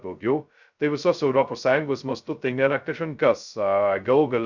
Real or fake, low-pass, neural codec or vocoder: fake; 7.2 kHz; codec, 16 kHz, 0.2 kbps, FocalCodec